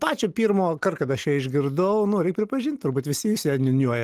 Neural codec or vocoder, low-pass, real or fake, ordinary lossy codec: none; 14.4 kHz; real; Opus, 24 kbps